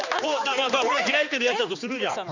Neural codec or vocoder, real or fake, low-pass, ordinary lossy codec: codec, 16 kHz, 2 kbps, X-Codec, HuBERT features, trained on general audio; fake; 7.2 kHz; none